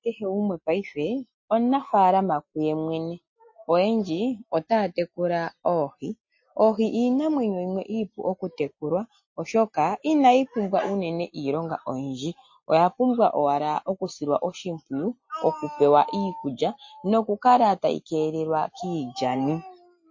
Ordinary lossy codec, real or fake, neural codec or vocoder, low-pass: MP3, 32 kbps; real; none; 7.2 kHz